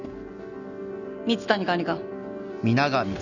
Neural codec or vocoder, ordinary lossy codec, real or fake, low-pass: none; none; real; 7.2 kHz